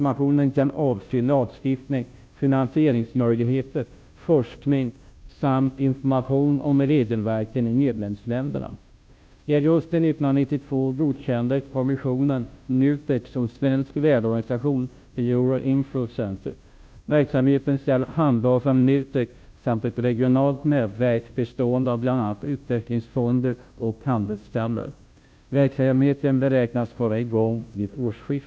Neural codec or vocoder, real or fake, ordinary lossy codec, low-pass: codec, 16 kHz, 0.5 kbps, FunCodec, trained on Chinese and English, 25 frames a second; fake; none; none